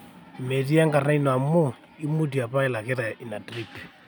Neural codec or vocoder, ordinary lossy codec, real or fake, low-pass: none; none; real; none